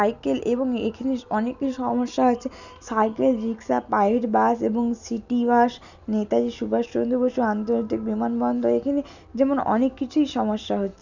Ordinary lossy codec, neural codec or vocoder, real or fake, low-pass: none; none; real; 7.2 kHz